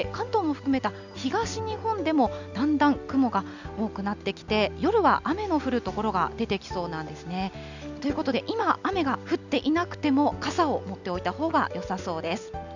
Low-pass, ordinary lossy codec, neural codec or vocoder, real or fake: 7.2 kHz; none; none; real